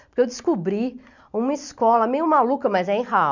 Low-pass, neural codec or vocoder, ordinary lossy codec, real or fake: 7.2 kHz; none; none; real